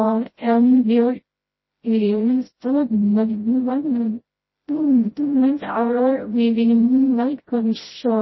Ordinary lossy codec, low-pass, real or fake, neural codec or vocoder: MP3, 24 kbps; 7.2 kHz; fake; codec, 16 kHz, 0.5 kbps, FreqCodec, smaller model